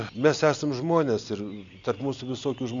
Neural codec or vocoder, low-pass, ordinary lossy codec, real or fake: none; 7.2 kHz; MP3, 64 kbps; real